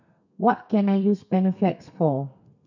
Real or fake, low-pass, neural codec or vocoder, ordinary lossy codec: fake; 7.2 kHz; codec, 32 kHz, 1.9 kbps, SNAC; none